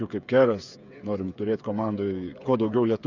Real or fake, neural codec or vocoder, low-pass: fake; codec, 16 kHz, 8 kbps, FreqCodec, smaller model; 7.2 kHz